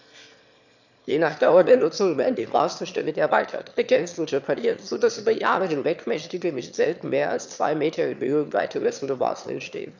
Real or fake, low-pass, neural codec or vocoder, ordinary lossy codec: fake; 7.2 kHz; autoencoder, 22.05 kHz, a latent of 192 numbers a frame, VITS, trained on one speaker; none